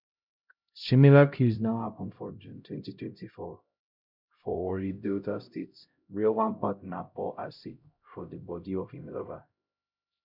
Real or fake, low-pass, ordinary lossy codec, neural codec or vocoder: fake; 5.4 kHz; none; codec, 16 kHz, 0.5 kbps, X-Codec, HuBERT features, trained on LibriSpeech